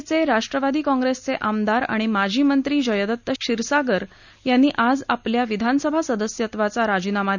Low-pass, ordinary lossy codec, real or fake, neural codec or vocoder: 7.2 kHz; none; real; none